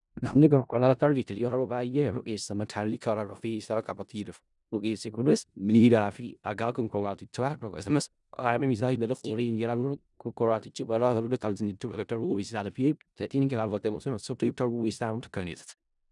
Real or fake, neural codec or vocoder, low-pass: fake; codec, 16 kHz in and 24 kHz out, 0.4 kbps, LongCat-Audio-Codec, four codebook decoder; 10.8 kHz